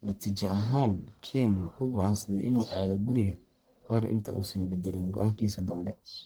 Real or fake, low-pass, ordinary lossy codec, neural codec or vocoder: fake; none; none; codec, 44.1 kHz, 1.7 kbps, Pupu-Codec